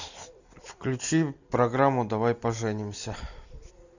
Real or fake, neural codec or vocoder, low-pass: real; none; 7.2 kHz